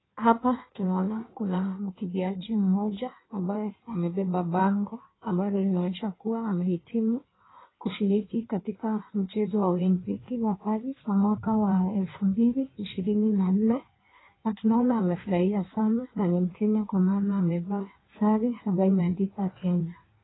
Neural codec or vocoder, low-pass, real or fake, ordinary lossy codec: codec, 16 kHz in and 24 kHz out, 1.1 kbps, FireRedTTS-2 codec; 7.2 kHz; fake; AAC, 16 kbps